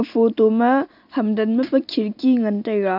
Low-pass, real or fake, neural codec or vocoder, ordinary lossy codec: 5.4 kHz; real; none; none